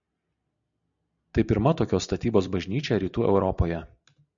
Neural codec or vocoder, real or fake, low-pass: none; real; 7.2 kHz